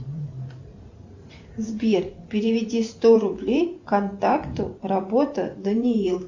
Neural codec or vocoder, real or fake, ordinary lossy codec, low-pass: vocoder, 44.1 kHz, 128 mel bands every 512 samples, BigVGAN v2; fake; Opus, 64 kbps; 7.2 kHz